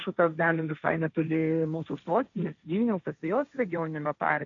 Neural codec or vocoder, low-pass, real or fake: codec, 16 kHz, 1.1 kbps, Voila-Tokenizer; 7.2 kHz; fake